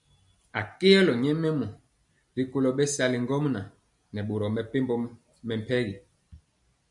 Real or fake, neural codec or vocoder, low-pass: real; none; 10.8 kHz